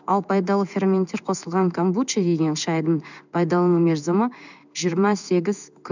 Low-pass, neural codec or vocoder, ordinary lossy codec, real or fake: 7.2 kHz; codec, 16 kHz in and 24 kHz out, 1 kbps, XY-Tokenizer; none; fake